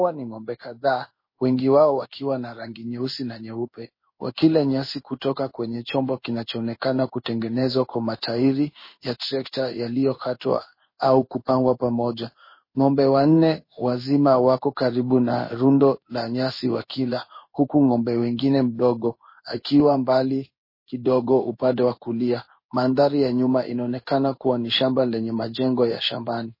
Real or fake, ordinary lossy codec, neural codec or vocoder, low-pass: fake; MP3, 24 kbps; codec, 16 kHz in and 24 kHz out, 1 kbps, XY-Tokenizer; 5.4 kHz